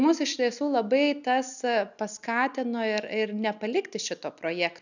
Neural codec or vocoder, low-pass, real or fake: none; 7.2 kHz; real